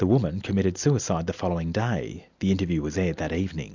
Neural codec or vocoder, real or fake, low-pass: none; real; 7.2 kHz